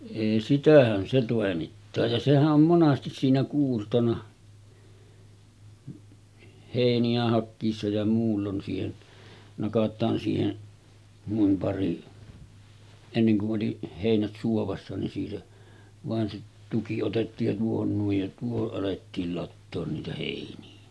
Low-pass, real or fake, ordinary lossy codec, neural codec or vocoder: none; real; none; none